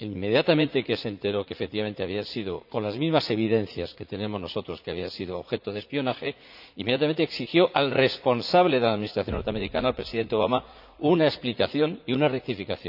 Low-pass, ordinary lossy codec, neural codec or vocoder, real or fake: 5.4 kHz; none; vocoder, 44.1 kHz, 80 mel bands, Vocos; fake